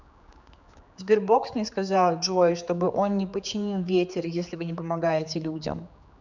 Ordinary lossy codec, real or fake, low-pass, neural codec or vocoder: none; fake; 7.2 kHz; codec, 16 kHz, 4 kbps, X-Codec, HuBERT features, trained on general audio